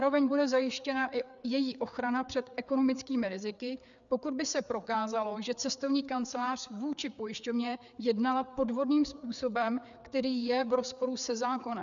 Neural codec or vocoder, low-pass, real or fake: codec, 16 kHz, 4 kbps, FreqCodec, larger model; 7.2 kHz; fake